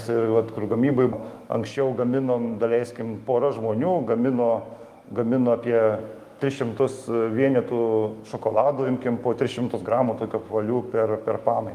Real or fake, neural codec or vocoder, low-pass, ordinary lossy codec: fake; autoencoder, 48 kHz, 128 numbers a frame, DAC-VAE, trained on Japanese speech; 14.4 kHz; Opus, 32 kbps